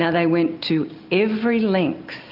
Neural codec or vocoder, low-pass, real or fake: none; 5.4 kHz; real